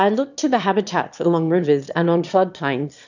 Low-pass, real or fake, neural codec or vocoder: 7.2 kHz; fake; autoencoder, 22.05 kHz, a latent of 192 numbers a frame, VITS, trained on one speaker